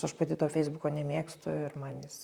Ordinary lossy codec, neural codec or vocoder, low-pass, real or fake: Opus, 64 kbps; vocoder, 44.1 kHz, 128 mel bands, Pupu-Vocoder; 19.8 kHz; fake